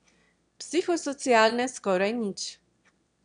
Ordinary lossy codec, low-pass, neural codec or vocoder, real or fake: none; 9.9 kHz; autoencoder, 22.05 kHz, a latent of 192 numbers a frame, VITS, trained on one speaker; fake